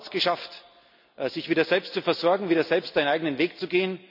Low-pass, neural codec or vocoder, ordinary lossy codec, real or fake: 5.4 kHz; none; none; real